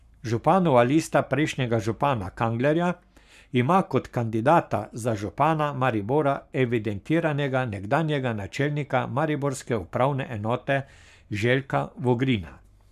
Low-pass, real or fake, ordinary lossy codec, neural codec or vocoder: 14.4 kHz; fake; none; codec, 44.1 kHz, 7.8 kbps, Pupu-Codec